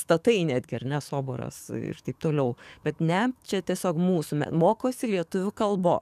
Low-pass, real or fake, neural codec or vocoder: 14.4 kHz; fake; codec, 44.1 kHz, 7.8 kbps, DAC